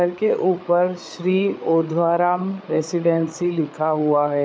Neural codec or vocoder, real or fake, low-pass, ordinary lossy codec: codec, 16 kHz, 8 kbps, FreqCodec, larger model; fake; none; none